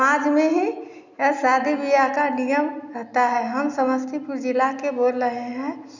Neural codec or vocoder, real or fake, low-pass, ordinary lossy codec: none; real; 7.2 kHz; none